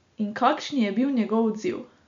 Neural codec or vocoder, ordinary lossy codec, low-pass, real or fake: none; MP3, 64 kbps; 7.2 kHz; real